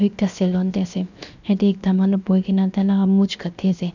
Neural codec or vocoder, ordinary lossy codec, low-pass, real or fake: codec, 16 kHz, 0.7 kbps, FocalCodec; none; 7.2 kHz; fake